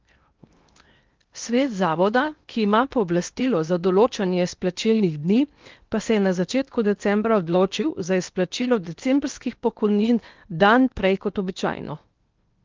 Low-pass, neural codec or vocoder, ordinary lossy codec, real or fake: 7.2 kHz; codec, 16 kHz in and 24 kHz out, 0.8 kbps, FocalCodec, streaming, 65536 codes; Opus, 24 kbps; fake